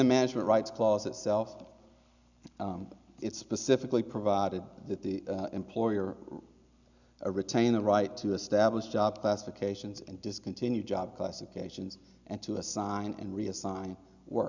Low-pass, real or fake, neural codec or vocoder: 7.2 kHz; real; none